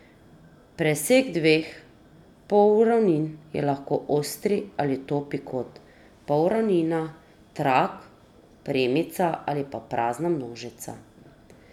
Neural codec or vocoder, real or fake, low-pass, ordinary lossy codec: none; real; 19.8 kHz; none